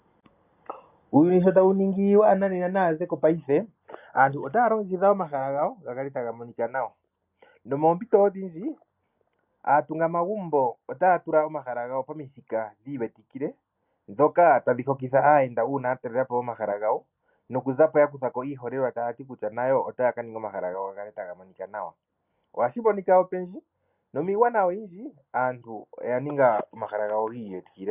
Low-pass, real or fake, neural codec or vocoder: 3.6 kHz; real; none